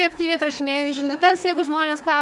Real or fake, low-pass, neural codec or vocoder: fake; 10.8 kHz; codec, 24 kHz, 1 kbps, SNAC